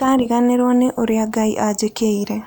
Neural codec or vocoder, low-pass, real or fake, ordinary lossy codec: none; none; real; none